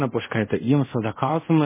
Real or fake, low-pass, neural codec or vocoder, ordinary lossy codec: fake; 3.6 kHz; codec, 24 kHz, 0.5 kbps, DualCodec; MP3, 16 kbps